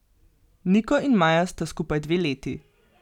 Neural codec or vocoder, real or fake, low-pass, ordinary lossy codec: none; real; 19.8 kHz; none